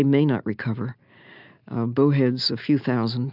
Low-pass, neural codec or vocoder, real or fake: 5.4 kHz; none; real